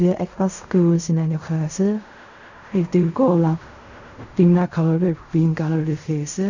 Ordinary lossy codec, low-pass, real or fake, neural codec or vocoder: none; 7.2 kHz; fake; codec, 16 kHz in and 24 kHz out, 0.4 kbps, LongCat-Audio-Codec, fine tuned four codebook decoder